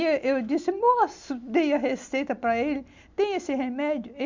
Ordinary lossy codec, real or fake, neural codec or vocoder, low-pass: MP3, 64 kbps; real; none; 7.2 kHz